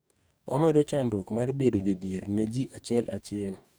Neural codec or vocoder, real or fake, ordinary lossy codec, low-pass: codec, 44.1 kHz, 2.6 kbps, DAC; fake; none; none